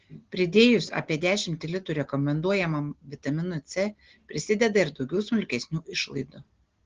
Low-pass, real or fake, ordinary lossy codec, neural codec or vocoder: 7.2 kHz; real; Opus, 16 kbps; none